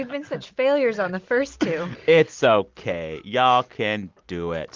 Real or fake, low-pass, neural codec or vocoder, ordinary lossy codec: real; 7.2 kHz; none; Opus, 24 kbps